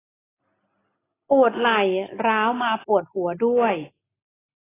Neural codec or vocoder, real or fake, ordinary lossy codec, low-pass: none; real; AAC, 16 kbps; 3.6 kHz